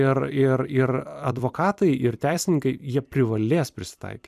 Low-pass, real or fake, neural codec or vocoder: 14.4 kHz; fake; vocoder, 44.1 kHz, 128 mel bands every 256 samples, BigVGAN v2